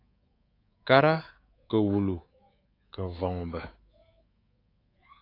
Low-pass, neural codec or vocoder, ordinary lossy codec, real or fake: 5.4 kHz; codec, 24 kHz, 3.1 kbps, DualCodec; AAC, 24 kbps; fake